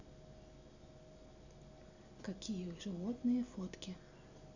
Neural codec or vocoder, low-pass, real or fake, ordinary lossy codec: none; 7.2 kHz; real; none